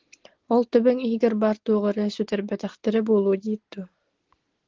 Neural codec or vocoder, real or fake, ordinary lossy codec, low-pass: none; real; Opus, 16 kbps; 7.2 kHz